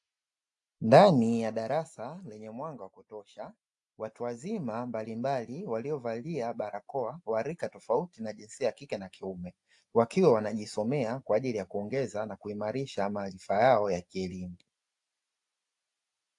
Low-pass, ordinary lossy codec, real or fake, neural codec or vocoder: 10.8 kHz; AAC, 64 kbps; real; none